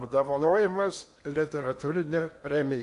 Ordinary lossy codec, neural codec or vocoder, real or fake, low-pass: AAC, 64 kbps; codec, 16 kHz in and 24 kHz out, 0.8 kbps, FocalCodec, streaming, 65536 codes; fake; 10.8 kHz